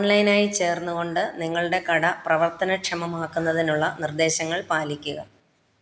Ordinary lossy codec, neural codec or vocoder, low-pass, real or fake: none; none; none; real